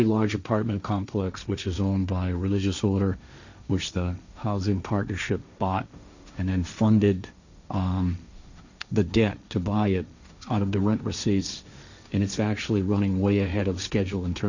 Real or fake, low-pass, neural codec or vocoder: fake; 7.2 kHz; codec, 16 kHz, 1.1 kbps, Voila-Tokenizer